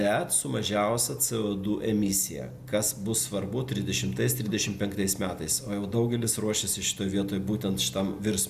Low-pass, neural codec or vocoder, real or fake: 14.4 kHz; none; real